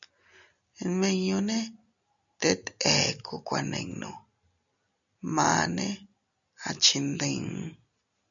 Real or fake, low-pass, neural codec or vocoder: real; 7.2 kHz; none